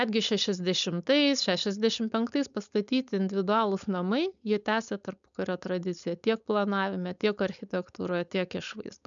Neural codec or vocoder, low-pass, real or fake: codec, 16 kHz, 4.8 kbps, FACodec; 7.2 kHz; fake